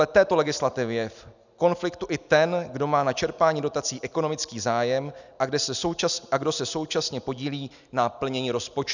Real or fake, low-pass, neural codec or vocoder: real; 7.2 kHz; none